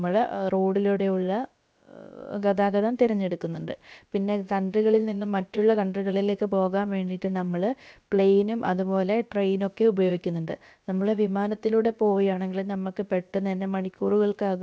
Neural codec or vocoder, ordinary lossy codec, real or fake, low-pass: codec, 16 kHz, about 1 kbps, DyCAST, with the encoder's durations; none; fake; none